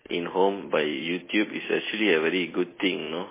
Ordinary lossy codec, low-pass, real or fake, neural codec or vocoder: MP3, 16 kbps; 3.6 kHz; real; none